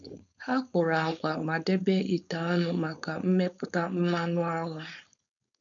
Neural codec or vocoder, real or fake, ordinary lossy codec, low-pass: codec, 16 kHz, 4.8 kbps, FACodec; fake; none; 7.2 kHz